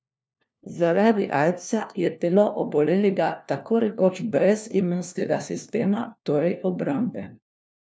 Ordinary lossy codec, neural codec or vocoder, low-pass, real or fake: none; codec, 16 kHz, 1 kbps, FunCodec, trained on LibriTTS, 50 frames a second; none; fake